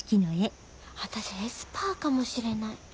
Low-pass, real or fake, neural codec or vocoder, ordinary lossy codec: none; real; none; none